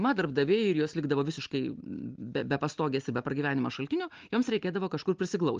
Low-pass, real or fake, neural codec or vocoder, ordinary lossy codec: 7.2 kHz; real; none; Opus, 24 kbps